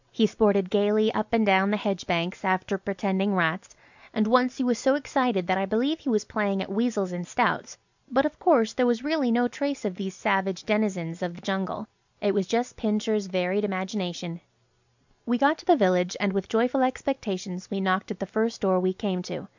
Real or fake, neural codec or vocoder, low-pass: real; none; 7.2 kHz